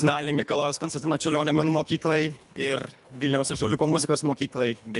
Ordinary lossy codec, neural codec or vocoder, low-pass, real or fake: AAC, 96 kbps; codec, 24 kHz, 1.5 kbps, HILCodec; 10.8 kHz; fake